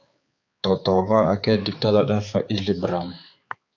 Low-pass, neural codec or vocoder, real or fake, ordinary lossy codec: 7.2 kHz; codec, 16 kHz, 4 kbps, X-Codec, HuBERT features, trained on balanced general audio; fake; AAC, 48 kbps